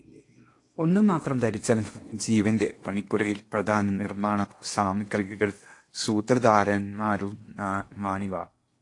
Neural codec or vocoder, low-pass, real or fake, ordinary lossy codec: codec, 16 kHz in and 24 kHz out, 0.8 kbps, FocalCodec, streaming, 65536 codes; 10.8 kHz; fake; AAC, 48 kbps